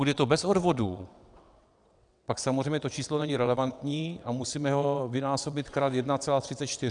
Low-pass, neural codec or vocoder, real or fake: 9.9 kHz; vocoder, 22.05 kHz, 80 mel bands, WaveNeXt; fake